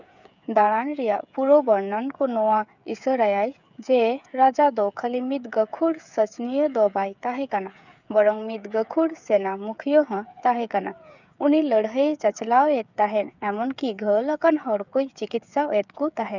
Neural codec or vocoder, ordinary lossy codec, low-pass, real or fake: codec, 16 kHz, 8 kbps, FreqCodec, smaller model; none; 7.2 kHz; fake